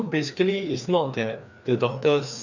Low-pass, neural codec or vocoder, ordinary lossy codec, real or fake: 7.2 kHz; codec, 16 kHz, 2 kbps, FreqCodec, larger model; none; fake